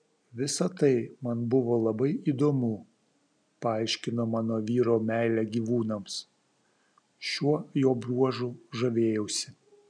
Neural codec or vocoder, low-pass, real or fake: none; 9.9 kHz; real